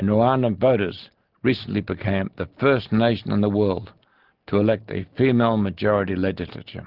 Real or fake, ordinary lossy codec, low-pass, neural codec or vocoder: real; Opus, 16 kbps; 5.4 kHz; none